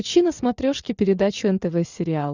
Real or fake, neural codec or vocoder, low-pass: real; none; 7.2 kHz